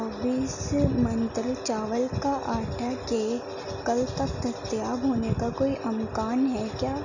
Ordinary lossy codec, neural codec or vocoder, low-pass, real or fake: none; none; 7.2 kHz; real